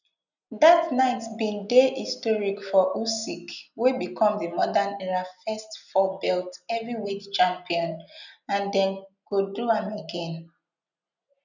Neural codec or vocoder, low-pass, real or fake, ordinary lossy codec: none; 7.2 kHz; real; none